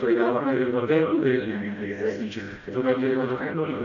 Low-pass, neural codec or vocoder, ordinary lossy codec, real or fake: 7.2 kHz; codec, 16 kHz, 0.5 kbps, FreqCodec, smaller model; AAC, 48 kbps; fake